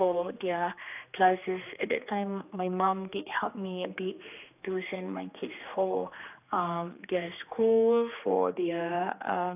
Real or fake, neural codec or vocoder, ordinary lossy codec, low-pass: fake; codec, 16 kHz, 2 kbps, X-Codec, HuBERT features, trained on general audio; AAC, 32 kbps; 3.6 kHz